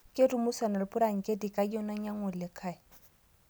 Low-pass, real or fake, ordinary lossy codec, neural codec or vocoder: none; real; none; none